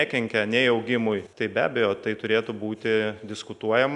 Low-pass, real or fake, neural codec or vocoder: 10.8 kHz; real; none